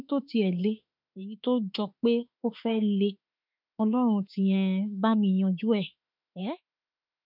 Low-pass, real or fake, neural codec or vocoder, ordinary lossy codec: 5.4 kHz; fake; autoencoder, 48 kHz, 32 numbers a frame, DAC-VAE, trained on Japanese speech; none